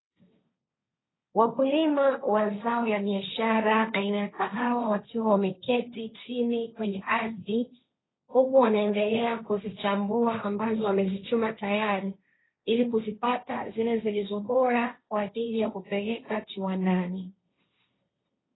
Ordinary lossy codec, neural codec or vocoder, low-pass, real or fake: AAC, 16 kbps; codec, 16 kHz, 1.1 kbps, Voila-Tokenizer; 7.2 kHz; fake